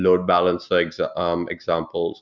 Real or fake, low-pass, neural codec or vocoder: real; 7.2 kHz; none